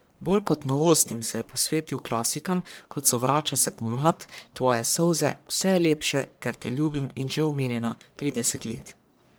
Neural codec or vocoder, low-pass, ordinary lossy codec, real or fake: codec, 44.1 kHz, 1.7 kbps, Pupu-Codec; none; none; fake